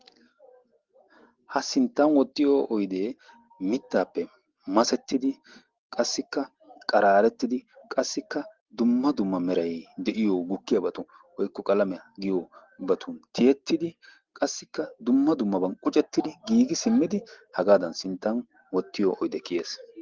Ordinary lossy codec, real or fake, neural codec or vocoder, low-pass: Opus, 16 kbps; real; none; 7.2 kHz